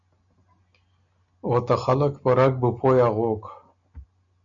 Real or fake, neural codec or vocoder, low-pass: real; none; 7.2 kHz